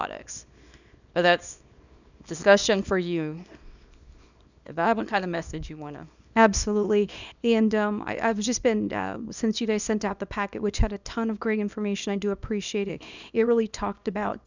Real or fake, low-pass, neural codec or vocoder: fake; 7.2 kHz; codec, 24 kHz, 0.9 kbps, WavTokenizer, small release